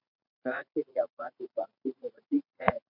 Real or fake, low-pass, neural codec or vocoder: fake; 5.4 kHz; vocoder, 44.1 kHz, 80 mel bands, Vocos